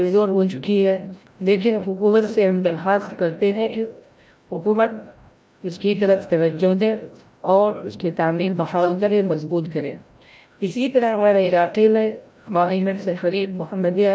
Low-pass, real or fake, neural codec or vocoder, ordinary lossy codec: none; fake; codec, 16 kHz, 0.5 kbps, FreqCodec, larger model; none